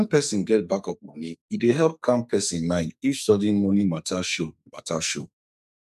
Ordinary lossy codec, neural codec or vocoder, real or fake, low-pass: none; codec, 44.1 kHz, 2.6 kbps, SNAC; fake; 14.4 kHz